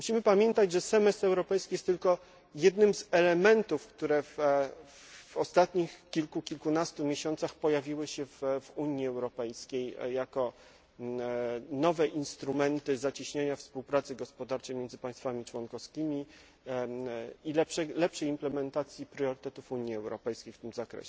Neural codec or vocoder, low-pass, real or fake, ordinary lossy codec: none; none; real; none